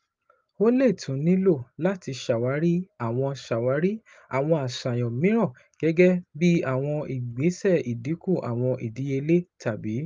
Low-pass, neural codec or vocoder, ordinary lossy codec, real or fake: 7.2 kHz; none; Opus, 24 kbps; real